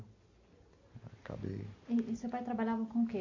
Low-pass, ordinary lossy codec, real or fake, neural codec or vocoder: 7.2 kHz; MP3, 48 kbps; real; none